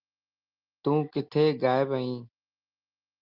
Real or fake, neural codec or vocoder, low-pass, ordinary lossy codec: real; none; 5.4 kHz; Opus, 24 kbps